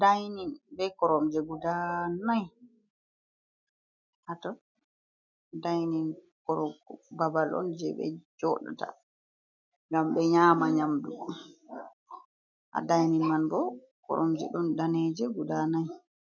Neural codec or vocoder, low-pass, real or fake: none; 7.2 kHz; real